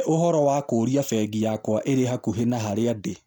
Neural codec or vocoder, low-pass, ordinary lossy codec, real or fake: none; none; none; real